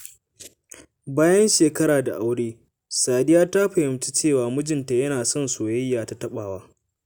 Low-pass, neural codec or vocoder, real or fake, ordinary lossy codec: none; none; real; none